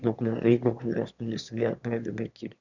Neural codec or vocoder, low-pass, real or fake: autoencoder, 22.05 kHz, a latent of 192 numbers a frame, VITS, trained on one speaker; 7.2 kHz; fake